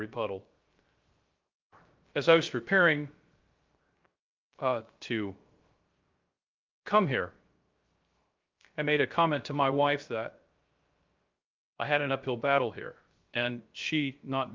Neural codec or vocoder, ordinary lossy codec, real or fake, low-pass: codec, 16 kHz, 0.3 kbps, FocalCodec; Opus, 24 kbps; fake; 7.2 kHz